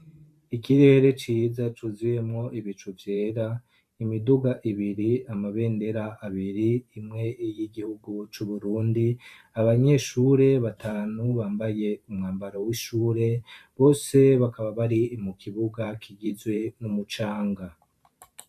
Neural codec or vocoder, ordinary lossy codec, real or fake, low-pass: vocoder, 44.1 kHz, 128 mel bands every 512 samples, BigVGAN v2; MP3, 96 kbps; fake; 14.4 kHz